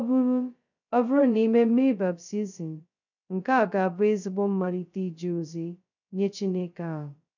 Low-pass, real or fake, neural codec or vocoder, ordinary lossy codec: 7.2 kHz; fake; codec, 16 kHz, 0.2 kbps, FocalCodec; none